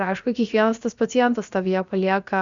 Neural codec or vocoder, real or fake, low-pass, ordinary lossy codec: codec, 16 kHz, about 1 kbps, DyCAST, with the encoder's durations; fake; 7.2 kHz; Opus, 64 kbps